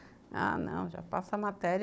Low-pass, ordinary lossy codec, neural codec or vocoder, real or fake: none; none; codec, 16 kHz, 16 kbps, FunCodec, trained on Chinese and English, 50 frames a second; fake